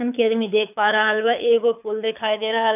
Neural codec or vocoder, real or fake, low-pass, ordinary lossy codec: codec, 24 kHz, 6 kbps, HILCodec; fake; 3.6 kHz; none